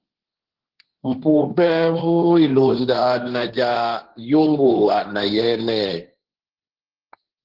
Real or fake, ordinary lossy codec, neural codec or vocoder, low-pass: fake; Opus, 16 kbps; codec, 16 kHz, 1.1 kbps, Voila-Tokenizer; 5.4 kHz